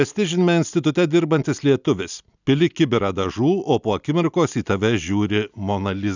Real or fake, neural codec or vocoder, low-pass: real; none; 7.2 kHz